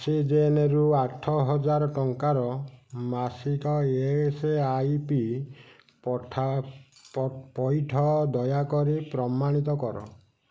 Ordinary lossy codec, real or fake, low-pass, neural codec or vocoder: none; real; none; none